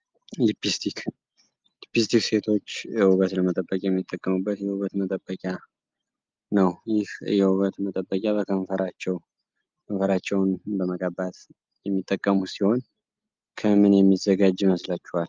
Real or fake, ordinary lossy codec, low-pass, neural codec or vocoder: real; Opus, 24 kbps; 7.2 kHz; none